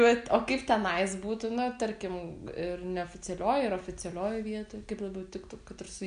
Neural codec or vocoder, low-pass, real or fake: none; 9.9 kHz; real